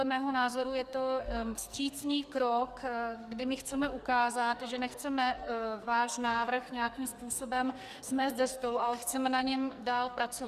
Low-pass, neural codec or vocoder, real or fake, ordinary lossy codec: 14.4 kHz; codec, 44.1 kHz, 2.6 kbps, SNAC; fake; Opus, 64 kbps